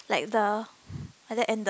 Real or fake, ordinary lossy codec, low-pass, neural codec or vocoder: real; none; none; none